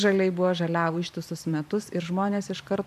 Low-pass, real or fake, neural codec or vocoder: 14.4 kHz; fake; vocoder, 44.1 kHz, 128 mel bands every 512 samples, BigVGAN v2